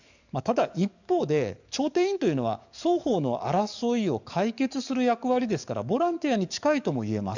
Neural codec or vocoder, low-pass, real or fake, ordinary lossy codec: codec, 44.1 kHz, 7.8 kbps, DAC; 7.2 kHz; fake; none